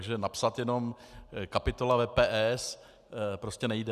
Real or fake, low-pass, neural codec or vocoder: real; 14.4 kHz; none